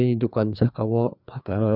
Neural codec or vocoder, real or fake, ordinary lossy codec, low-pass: codec, 16 kHz, 2 kbps, FreqCodec, larger model; fake; none; 5.4 kHz